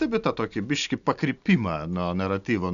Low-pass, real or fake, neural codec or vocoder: 7.2 kHz; real; none